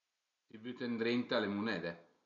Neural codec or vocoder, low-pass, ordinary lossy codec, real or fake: none; 7.2 kHz; none; real